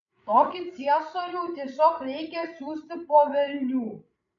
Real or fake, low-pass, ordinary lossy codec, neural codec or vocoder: fake; 7.2 kHz; AAC, 64 kbps; codec, 16 kHz, 16 kbps, FreqCodec, larger model